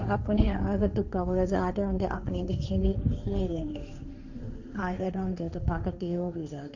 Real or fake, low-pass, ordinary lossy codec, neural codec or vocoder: fake; 7.2 kHz; none; codec, 16 kHz, 1.1 kbps, Voila-Tokenizer